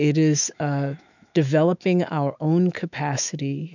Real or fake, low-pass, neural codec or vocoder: fake; 7.2 kHz; autoencoder, 48 kHz, 128 numbers a frame, DAC-VAE, trained on Japanese speech